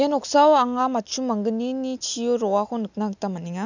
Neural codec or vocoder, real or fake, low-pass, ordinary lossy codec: none; real; 7.2 kHz; none